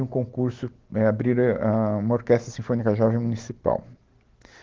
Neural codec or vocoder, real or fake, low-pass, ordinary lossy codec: none; real; 7.2 kHz; Opus, 16 kbps